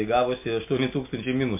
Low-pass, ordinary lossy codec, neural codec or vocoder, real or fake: 3.6 kHz; MP3, 32 kbps; none; real